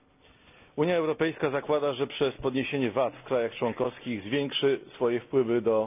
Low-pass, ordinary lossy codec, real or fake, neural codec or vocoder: 3.6 kHz; none; real; none